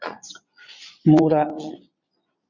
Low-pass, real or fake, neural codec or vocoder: 7.2 kHz; fake; vocoder, 22.05 kHz, 80 mel bands, Vocos